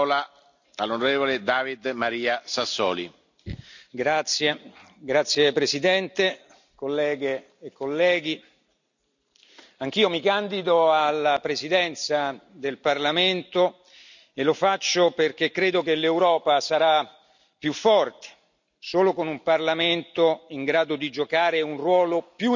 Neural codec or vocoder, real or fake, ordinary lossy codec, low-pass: none; real; none; 7.2 kHz